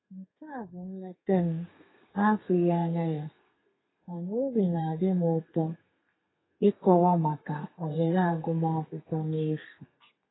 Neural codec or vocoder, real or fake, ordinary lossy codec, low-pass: codec, 32 kHz, 1.9 kbps, SNAC; fake; AAC, 16 kbps; 7.2 kHz